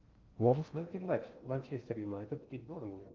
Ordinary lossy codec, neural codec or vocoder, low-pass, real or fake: Opus, 32 kbps; codec, 16 kHz in and 24 kHz out, 0.6 kbps, FocalCodec, streaming, 2048 codes; 7.2 kHz; fake